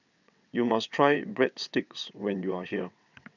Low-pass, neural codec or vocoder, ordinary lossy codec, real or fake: 7.2 kHz; vocoder, 22.05 kHz, 80 mel bands, WaveNeXt; none; fake